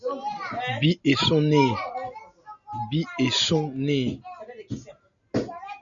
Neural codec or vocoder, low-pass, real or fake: none; 7.2 kHz; real